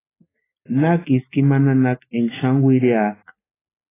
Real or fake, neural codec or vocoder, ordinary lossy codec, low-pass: real; none; AAC, 16 kbps; 3.6 kHz